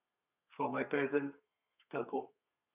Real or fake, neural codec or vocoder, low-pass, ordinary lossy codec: fake; codec, 32 kHz, 1.9 kbps, SNAC; 3.6 kHz; none